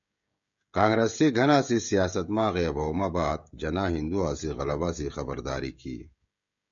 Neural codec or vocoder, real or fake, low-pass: codec, 16 kHz, 16 kbps, FreqCodec, smaller model; fake; 7.2 kHz